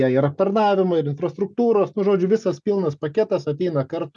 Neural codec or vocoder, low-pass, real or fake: none; 10.8 kHz; real